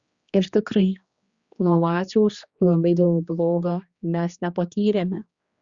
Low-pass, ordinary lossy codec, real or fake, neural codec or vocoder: 7.2 kHz; Opus, 64 kbps; fake; codec, 16 kHz, 2 kbps, X-Codec, HuBERT features, trained on general audio